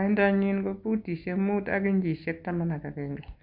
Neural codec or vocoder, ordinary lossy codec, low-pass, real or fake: none; Opus, 64 kbps; 5.4 kHz; real